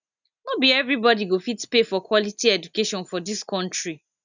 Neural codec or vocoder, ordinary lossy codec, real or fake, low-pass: none; none; real; 7.2 kHz